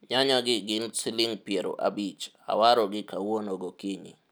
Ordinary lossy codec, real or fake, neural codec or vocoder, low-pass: none; real; none; none